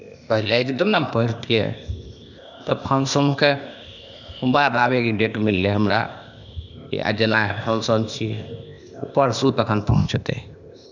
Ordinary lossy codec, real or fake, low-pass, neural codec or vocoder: none; fake; 7.2 kHz; codec, 16 kHz, 0.8 kbps, ZipCodec